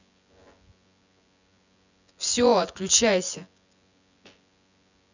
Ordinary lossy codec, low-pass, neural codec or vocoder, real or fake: none; 7.2 kHz; vocoder, 24 kHz, 100 mel bands, Vocos; fake